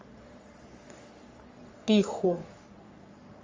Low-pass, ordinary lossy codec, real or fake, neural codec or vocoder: 7.2 kHz; Opus, 32 kbps; fake; codec, 44.1 kHz, 3.4 kbps, Pupu-Codec